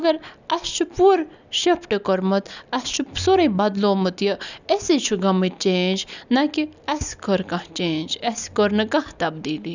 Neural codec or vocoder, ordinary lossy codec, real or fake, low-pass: none; none; real; 7.2 kHz